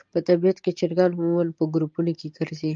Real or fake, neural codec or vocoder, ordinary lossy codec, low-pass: real; none; Opus, 24 kbps; 7.2 kHz